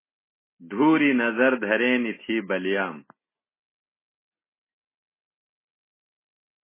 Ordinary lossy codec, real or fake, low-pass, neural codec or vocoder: MP3, 16 kbps; real; 3.6 kHz; none